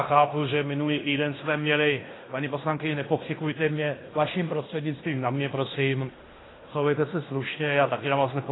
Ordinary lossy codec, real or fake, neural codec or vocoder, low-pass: AAC, 16 kbps; fake; codec, 16 kHz in and 24 kHz out, 0.9 kbps, LongCat-Audio-Codec, fine tuned four codebook decoder; 7.2 kHz